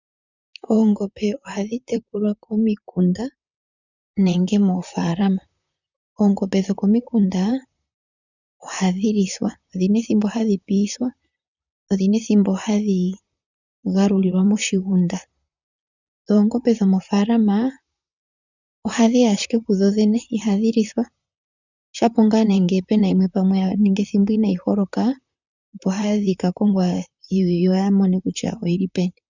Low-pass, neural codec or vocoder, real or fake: 7.2 kHz; vocoder, 44.1 kHz, 128 mel bands, Pupu-Vocoder; fake